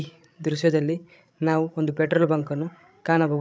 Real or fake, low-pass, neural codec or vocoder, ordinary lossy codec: fake; none; codec, 16 kHz, 16 kbps, FreqCodec, larger model; none